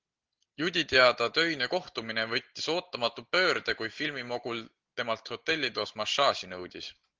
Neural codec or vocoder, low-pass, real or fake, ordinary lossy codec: none; 7.2 kHz; real; Opus, 16 kbps